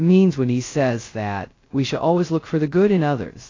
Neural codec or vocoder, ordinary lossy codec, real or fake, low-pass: codec, 16 kHz, 0.2 kbps, FocalCodec; AAC, 32 kbps; fake; 7.2 kHz